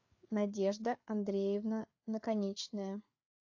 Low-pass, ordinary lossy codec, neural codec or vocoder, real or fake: 7.2 kHz; AAC, 48 kbps; codec, 16 kHz, 4 kbps, FreqCodec, larger model; fake